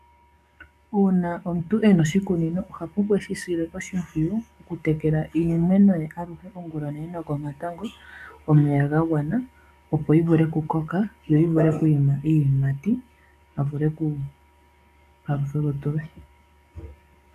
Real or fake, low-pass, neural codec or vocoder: fake; 14.4 kHz; codec, 44.1 kHz, 7.8 kbps, DAC